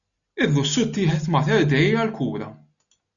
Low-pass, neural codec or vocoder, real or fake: 7.2 kHz; none; real